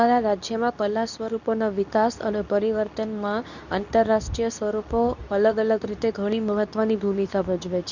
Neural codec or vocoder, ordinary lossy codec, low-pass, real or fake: codec, 24 kHz, 0.9 kbps, WavTokenizer, medium speech release version 2; none; 7.2 kHz; fake